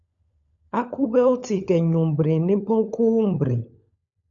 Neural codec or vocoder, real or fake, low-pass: codec, 16 kHz, 16 kbps, FunCodec, trained on LibriTTS, 50 frames a second; fake; 7.2 kHz